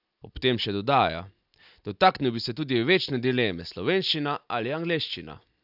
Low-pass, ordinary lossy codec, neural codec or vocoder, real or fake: 5.4 kHz; none; none; real